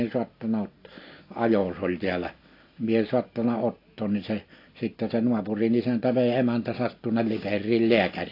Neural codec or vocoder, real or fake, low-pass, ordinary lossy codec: none; real; 5.4 kHz; AAC, 32 kbps